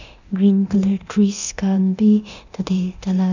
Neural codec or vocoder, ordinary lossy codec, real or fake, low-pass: codec, 24 kHz, 0.9 kbps, DualCodec; none; fake; 7.2 kHz